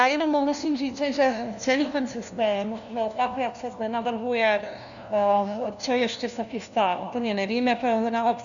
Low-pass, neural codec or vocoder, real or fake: 7.2 kHz; codec, 16 kHz, 1 kbps, FunCodec, trained on LibriTTS, 50 frames a second; fake